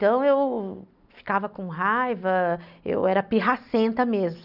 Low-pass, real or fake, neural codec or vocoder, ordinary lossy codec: 5.4 kHz; real; none; none